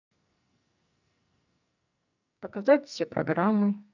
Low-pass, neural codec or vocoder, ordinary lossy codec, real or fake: 7.2 kHz; codec, 44.1 kHz, 2.6 kbps, SNAC; none; fake